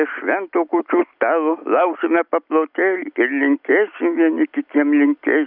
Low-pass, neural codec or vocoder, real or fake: 5.4 kHz; none; real